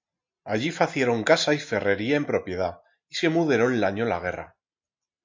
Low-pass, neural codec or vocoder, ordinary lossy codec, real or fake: 7.2 kHz; none; MP3, 48 kbps; real